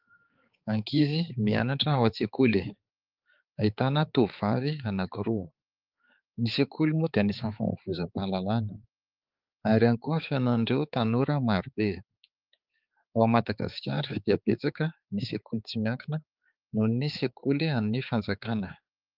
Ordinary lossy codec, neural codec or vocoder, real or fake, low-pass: Opus, 32 kbps; codec, 16 kHz, 4 kbps, X-Codec, HuBERT features, trained on balanced general audio; fake; 5.4 kHz